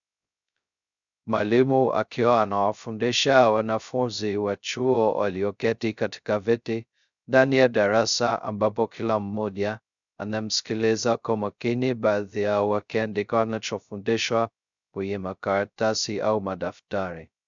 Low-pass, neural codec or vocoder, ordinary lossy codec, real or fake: 7.2 kHz; codec, 16 kHz, 0.2 kbps, FocalCodec; MP3, 96 kbps; fake